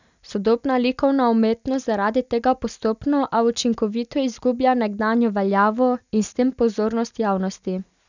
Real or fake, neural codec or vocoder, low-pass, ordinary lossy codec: real; none; 7.2 kHz; none